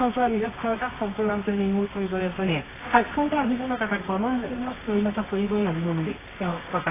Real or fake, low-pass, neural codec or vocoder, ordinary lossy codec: fake; 3.6 kHz; codec, 24 kHz, 0.9 kbps, WavTokenizer, medium music audio release; AAC, 16 kbps